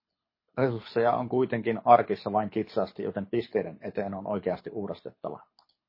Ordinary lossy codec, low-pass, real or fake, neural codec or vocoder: MP3, 24 kbps; 5.4 kHz; fake; codec, 24 kHz, 6 kbps, HILCodec